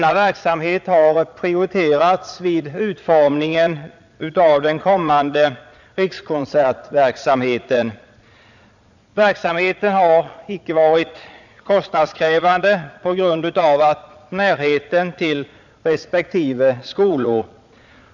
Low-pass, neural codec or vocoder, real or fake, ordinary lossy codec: 7.2 kHz; vocoder, 22.05 kHz, 80 mel bands, Vocos; fake; none